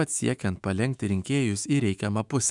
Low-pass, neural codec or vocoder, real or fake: 10.8 kHz; autoencoder, 48 kHz, 128 numbers a frame, DAC-VAE, trained on Japanese speech; fake